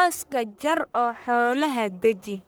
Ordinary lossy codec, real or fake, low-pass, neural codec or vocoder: none; fake; none; codec, 44.1 kHz, 1.7 kbps, Pupu-Codec